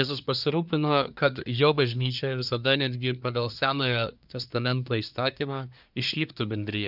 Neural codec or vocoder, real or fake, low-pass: codec, 24 kHz, 1 kbps, SNAC; fake; 5.4 kHz